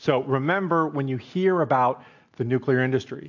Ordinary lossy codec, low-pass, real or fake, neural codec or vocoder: AAC, 48 kbps; 7.2 kHz; real; none